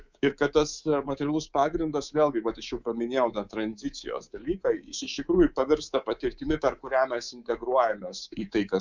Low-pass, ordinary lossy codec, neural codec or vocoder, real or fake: 7.2 kHz; Opus, 64 kbps; codec, 24 kHz, 3.1 kbps, DualCodec; fake